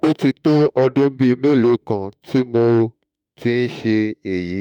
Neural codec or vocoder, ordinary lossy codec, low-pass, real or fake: autoencoder, 48 kHz, 32 numbers a frame, DAC-VAE, trained on Japanese speech; none; 19.8 kHz; fake